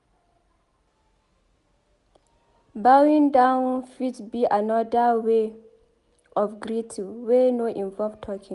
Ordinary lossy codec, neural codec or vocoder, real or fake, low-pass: none; none; real; 10.8 kHz